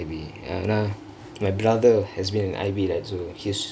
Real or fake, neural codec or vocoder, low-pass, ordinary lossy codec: real; none; none; none